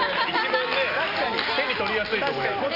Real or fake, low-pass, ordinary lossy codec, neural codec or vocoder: real; 5.4 kHz; none; none